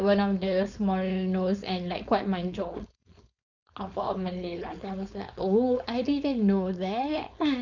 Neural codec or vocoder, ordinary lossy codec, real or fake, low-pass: codec, 16 kHz, 4.8 kbps, FACodec; none; fake; 7.2 kHz